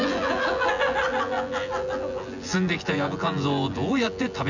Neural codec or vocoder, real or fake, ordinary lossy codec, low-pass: vocoder, 24 kHz, 100 mel bands, Vocos; fake; none; 7.2 kHz